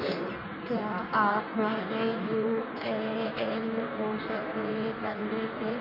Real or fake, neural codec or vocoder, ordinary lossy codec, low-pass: fake; codec, 16 kHz in and 24 kHz out, 1.1 kbps, FireRedTTS-2 codec; none; 5.4 kHz